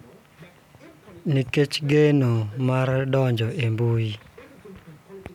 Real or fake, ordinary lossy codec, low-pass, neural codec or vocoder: real; none; 19.8 kHz; none